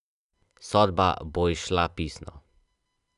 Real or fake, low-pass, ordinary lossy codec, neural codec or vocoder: real; 10.8 kHz; none; none